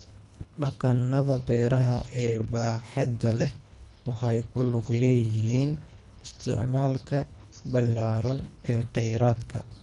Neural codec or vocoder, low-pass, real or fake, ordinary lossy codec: codec, 24 kHz, 1.5 kbps, HILCodec; 10.8 kHz; fake; MP3, 96 kbps